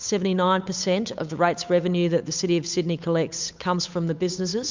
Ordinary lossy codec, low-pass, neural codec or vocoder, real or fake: MP3, 64 kbps; 7.2 kHz; codec, 16 kHz, 8 kbps, FunCodec, trained on Chinese and English, 25 frames a second; fake